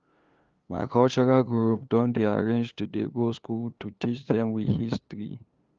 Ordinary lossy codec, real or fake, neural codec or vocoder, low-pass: Opus, 32 kbps; fake; codec, 16 kHz, 2 kbps, FunCodec, trained on LibriTTS, 25 frames a second; 7.2 kHz